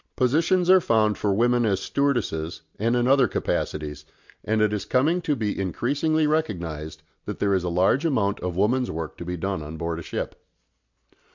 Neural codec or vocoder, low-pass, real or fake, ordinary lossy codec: none; 7.2 kHz; real; MP3, 64 kbps